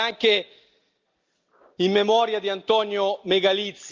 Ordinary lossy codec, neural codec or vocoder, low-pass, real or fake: Opus, 24 kbps; none; 7.2 kHz; real